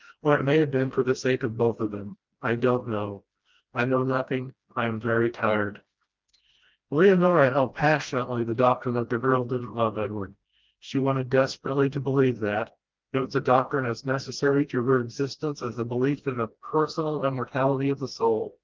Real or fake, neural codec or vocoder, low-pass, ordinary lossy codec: fake; codec, 16 kHz, 1 kbps, FreqCodec, smaller model; 7.2 kHz; Opus, 24 kbps